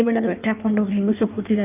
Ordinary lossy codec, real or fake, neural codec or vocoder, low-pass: none; fake; codec, 16 kHz in and 24 kHz out, 1.1 kbps, FireRedTTS-2 codec; 3.6 kHz